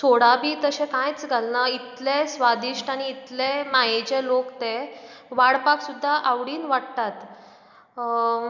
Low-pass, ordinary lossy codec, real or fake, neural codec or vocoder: 7.2 kHz; none; real; none